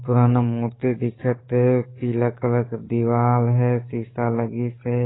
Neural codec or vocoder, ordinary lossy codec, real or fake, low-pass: codec, 44.1 kHz, 7.8 kbps, DAC; AAC, 16 kbps; fake; 7.2 kHz